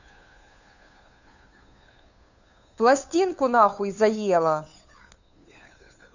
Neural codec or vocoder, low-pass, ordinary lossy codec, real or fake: codec, 16 kHz, 2 kbps, FunCodec, trained on Chinese and English, 25 frames a second; 7.2 kHz; none; fake